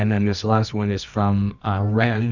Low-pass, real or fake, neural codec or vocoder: 7.2 kHz; fake; codec, 24 kHz, 0.9 kbps, WavTokenizer, medium music audio release